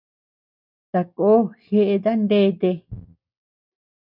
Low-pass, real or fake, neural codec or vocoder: 5.4 kHz; real; none